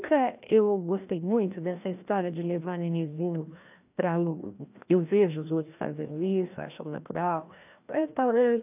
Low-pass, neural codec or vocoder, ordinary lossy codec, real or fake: 3.6 kHz; codec, 16 kHz, 1 kbps, FreqCodec, larger model; AAC, 32 kbps; fake